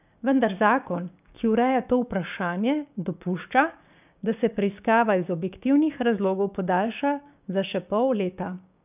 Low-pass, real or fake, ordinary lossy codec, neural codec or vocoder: 3.6 kHz; fake; none; codec, 16 kHz, 6 kbps, DAC